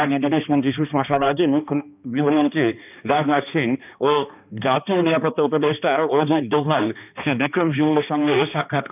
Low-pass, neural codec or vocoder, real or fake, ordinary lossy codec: 3.6 kHz; codec, 16 kHz, 2 kbps, X-Codec, HuBERT features, trained on balanced general audio; fake; none